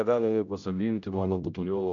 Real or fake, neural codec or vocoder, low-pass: fake; codec, 16 kHz, 0.5 kbps, X-Codec, HuBERT features, trained on general audio; 7.2 kHz